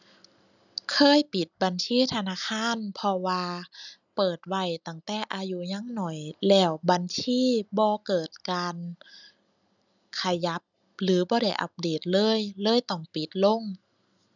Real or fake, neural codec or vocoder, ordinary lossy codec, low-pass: real; none; none; 7.2 kHz